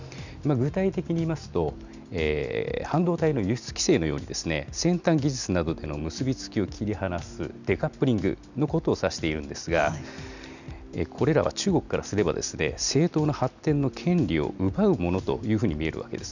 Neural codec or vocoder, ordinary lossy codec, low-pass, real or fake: none; none; 7.2 kHz; real